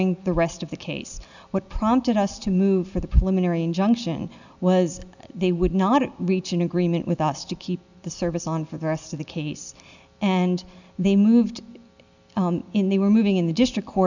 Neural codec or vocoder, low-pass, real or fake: none; 7.2 kHz; real